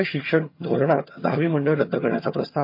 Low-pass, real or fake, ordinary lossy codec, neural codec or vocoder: 5.4 kHz; fake; MP3, 32 kbps; vocoder, 22.05 kHz, 80 mel bands, HiFi-GAN